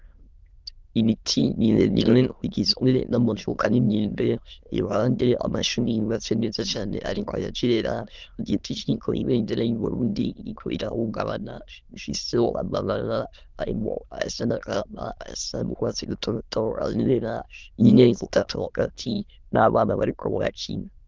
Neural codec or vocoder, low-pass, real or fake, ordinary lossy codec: autoencoder, 22.05 kHz, a latent of 192 numbers a frame, VITS, trained on many speakers; 7.2 kHz; fake; Opus, 32 kbps